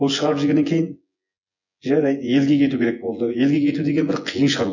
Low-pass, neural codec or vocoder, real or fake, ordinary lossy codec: 7.2 kHz; vocoder, 24 kHz, 100 mel bands, Vocos; fake; none